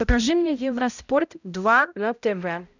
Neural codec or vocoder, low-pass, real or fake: codec, 16 kHz, 0.5 kbps, X-Codec, HuBERT features, trained on balanced general audio; 7.2 kHz; fake